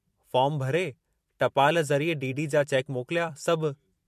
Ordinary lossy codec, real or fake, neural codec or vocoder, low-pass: MP3, 64 kbps; fake; vocoder, 44.1 kHz, 128 mel bands every 256 samples, BigVGAN v2; 14.4 kHz